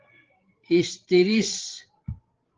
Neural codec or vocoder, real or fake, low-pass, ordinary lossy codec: codec, 16 kHz, 16 kbps, FreqCodec, larger model; fake; 7.2 kHz; Opus, 16 kbps